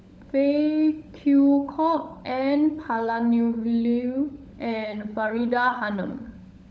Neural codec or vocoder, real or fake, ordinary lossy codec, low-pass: codec, 16 kHz, 16 kbps, FunCodec, trained on LibriTTS, 50 frames a second; fake; none; none